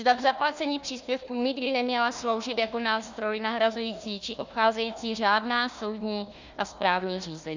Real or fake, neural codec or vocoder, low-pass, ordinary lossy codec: fake; codec, 16 kHz, 1 kbps, FunCodec, trained on Chinese and English, 50 frames a second; 7.2 kHz; Opus, 64 kbps